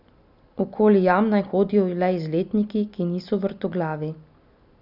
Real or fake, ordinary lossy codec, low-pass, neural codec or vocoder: real; none; 5.4 kHz; none